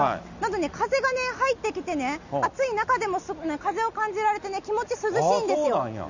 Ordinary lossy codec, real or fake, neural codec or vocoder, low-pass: none; real; none; 7.2 kHz